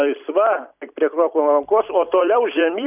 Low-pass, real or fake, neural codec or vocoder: 3.6 kHz; fake; vocoder, 44.1 kHz, 128 mel bands every 256 samples, BigVGAN v2